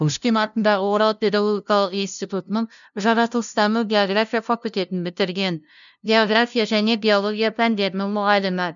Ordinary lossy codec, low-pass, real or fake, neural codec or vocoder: none; 7.2 kHz; fake; codec, 16 kHz, 0.5 kbps, FunCodec, trained on LibriTTS, 25 frames a second